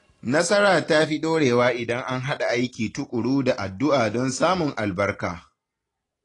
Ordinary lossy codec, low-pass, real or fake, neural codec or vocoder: AAC, 32 kbps; 10.8 kHz; real; none